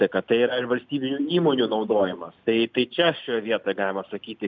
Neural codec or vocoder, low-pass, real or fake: none; 7.2 kHz; real